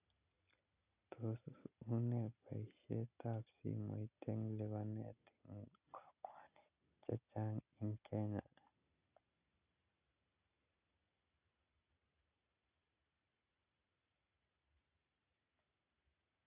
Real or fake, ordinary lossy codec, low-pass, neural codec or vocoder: real; none; 3.6 kHz; none